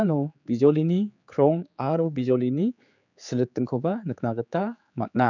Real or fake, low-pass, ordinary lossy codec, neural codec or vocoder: fake; 7.2 kHz; none; codec, 16 kHz, 4 kbps, X-Codec, HuBERT features, trained on general audio